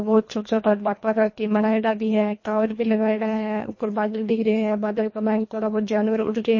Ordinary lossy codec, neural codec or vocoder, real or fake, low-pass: MP3, 32 kbps; codec, 24 kHz, 1.5 kbps, HILCodec; fake; 7.2 kHz